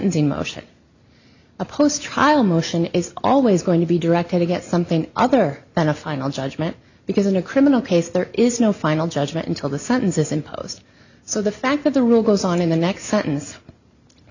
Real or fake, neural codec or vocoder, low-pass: real; none; 7.2 kHz